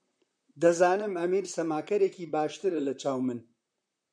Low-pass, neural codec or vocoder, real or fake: 9.9 kHz; vocoder, 44.1 kHz, 128 mel bands, Pupu-Vocoder; fake